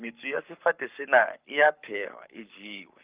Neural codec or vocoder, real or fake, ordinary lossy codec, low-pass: codec, 44.1 kHz, 7.8 kbps, Pupu-Codec; fake; Opus, 24 kbps; 3.6 kHz